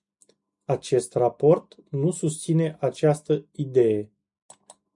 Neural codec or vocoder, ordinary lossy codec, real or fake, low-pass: none; MP3, 96 kbps; real; 10.8 kHz